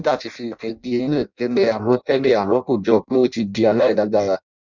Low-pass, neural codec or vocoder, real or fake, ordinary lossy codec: 7.2 kHz; codec, 16 kHz in and 24 kHz out, 0.6 kbps, FireRedTTS-2 codec; fake; none